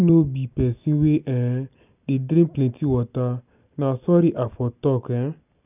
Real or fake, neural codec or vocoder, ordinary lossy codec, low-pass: real; none; none; 3.6 kHz